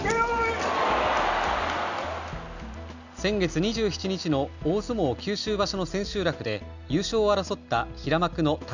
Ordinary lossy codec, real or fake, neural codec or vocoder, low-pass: none; real; none; 7.2 kHz